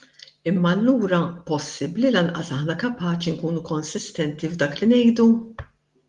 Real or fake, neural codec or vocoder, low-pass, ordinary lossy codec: real; none; 10.8 kHz; Opus, 24 kbps